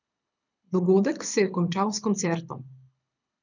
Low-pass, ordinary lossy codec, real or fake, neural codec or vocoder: 7.2 kHz; none; fake; codec, 24 kHz, 6 kbps, HILCodec